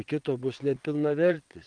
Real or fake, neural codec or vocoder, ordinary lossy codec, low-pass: fake; vocoder, 22.05 kHz, 80 mel bands, WaveNeXt; Opus, 32 kbps; 9.9 kHz